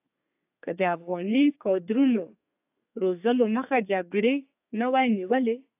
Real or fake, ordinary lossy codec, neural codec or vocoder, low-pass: fake; AAC, 32 kbps; codec, 32 kHz, 1.9 kbps, SNAC; 3.6 kHz